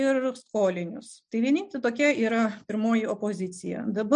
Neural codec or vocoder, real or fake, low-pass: none; real; 9.9 kHz